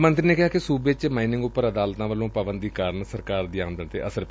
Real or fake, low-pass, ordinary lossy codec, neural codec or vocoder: real; none; none; none